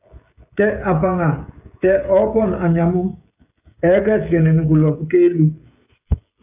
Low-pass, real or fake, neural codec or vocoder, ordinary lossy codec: 3.6 kHz; fake; codec, 16 kHz, 16 kbps, FreqCodec, smaller model; AAC, 32 kbps